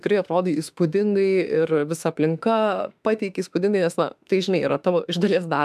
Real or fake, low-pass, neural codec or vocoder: fake; 14.4 kHz; autoencoder, 48 kHz, 32 numbers a frame, DAC-VAE, trained on Japanese speech